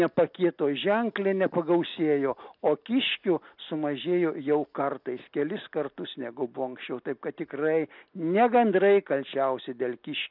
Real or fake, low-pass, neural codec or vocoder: real; 5.4 kHz; none